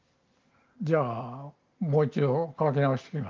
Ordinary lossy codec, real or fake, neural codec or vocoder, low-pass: Opus, 32 kbps; real; none; 7.2 kHz